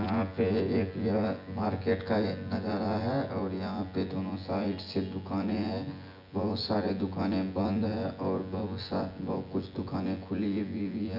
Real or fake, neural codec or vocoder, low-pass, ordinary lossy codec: fake; vocoder, 24 kHz, 100 mel bands, Vocos; 5.4 kHz; none